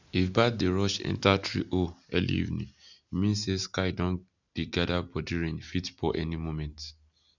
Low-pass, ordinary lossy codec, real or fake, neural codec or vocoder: 7.2 kHz; none; real; none